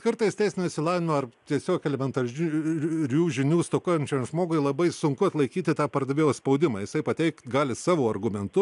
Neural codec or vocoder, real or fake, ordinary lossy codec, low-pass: none; real; AAC, 96 kbps; 10.8 kHz